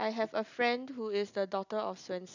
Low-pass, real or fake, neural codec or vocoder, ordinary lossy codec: 7.2 kHz; real; none; none